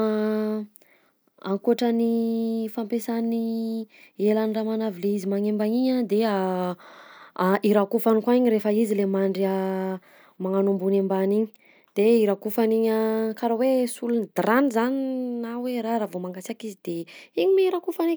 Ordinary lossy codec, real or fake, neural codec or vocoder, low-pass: none; real; none; none